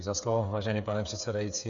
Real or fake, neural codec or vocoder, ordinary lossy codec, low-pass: fake; codec, 16 kHz, 8 kbps, FreqCodec, smaller model; AAC, 64 kbps; 7.2 kHz